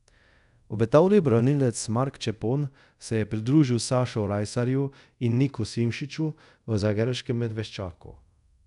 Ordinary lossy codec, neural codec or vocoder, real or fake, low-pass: none; codec, 24 kHz, 0.5 kbps, DualCodec; fake; 10.8 kHz